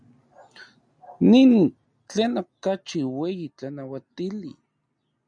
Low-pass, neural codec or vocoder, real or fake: 9.9 kHz; none; real